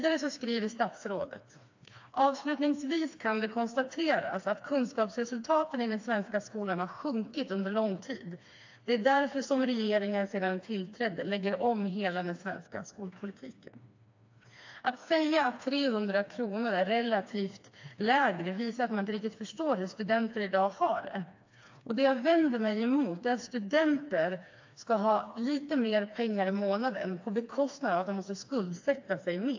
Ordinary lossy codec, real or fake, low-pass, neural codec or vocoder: AAC, 48 kbps; fake; 7.2 kHz; codec, 16 kHz, 2 kbps, FreqCodec, smaller model